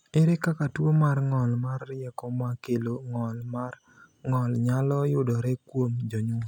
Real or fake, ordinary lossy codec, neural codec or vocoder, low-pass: real; none; none; 19.8 kHz